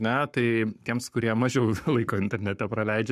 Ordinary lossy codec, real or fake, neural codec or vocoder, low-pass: MP3, 96 kbps; fake; codec, 44.1 kHz, 7.8 kbps, Pupu-Codec; 14.4 kHz